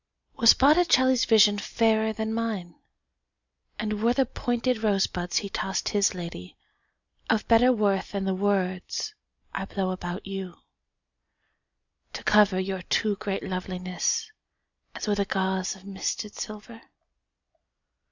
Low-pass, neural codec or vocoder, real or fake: 7.2 kHz; none; real